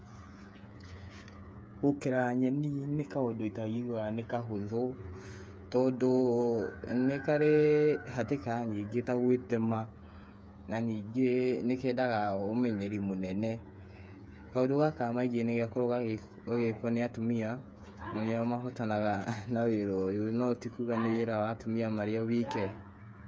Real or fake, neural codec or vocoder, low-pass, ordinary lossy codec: fake; codec, 16 kHz, 8 kbps, FreqCodec, smaller model; none; none